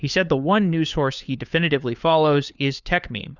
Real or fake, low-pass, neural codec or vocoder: fake; 7.2 kHz; codec, 16 kHz, 4 kbps, FunCodec, trained on LibriTTS, 50 frames a second